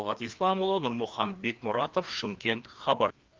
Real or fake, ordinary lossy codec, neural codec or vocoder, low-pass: fake; Opus, 32 kbps; codec, 16 kHz, 2 kbps, FreqCodec, larger model; 7.2 kHz